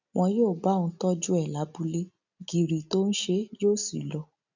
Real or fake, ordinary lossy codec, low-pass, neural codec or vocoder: real; none; 7.2 kHz; none